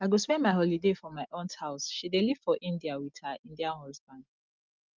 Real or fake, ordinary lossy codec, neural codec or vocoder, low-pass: real; Opus, 32 kbps; none; 7.2 kHz